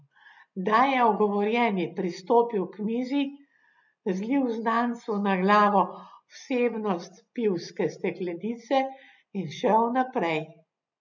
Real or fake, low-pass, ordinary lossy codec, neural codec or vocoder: real; 7.2 kHz; none; none